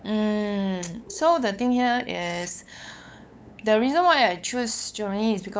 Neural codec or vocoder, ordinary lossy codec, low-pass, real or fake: codec, 16 kHz, 8 kbps, FunCodec, trained on LibriTTS, 25 frames a second; none; none; fake